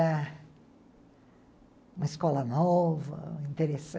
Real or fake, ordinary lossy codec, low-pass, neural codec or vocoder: real; none; none; none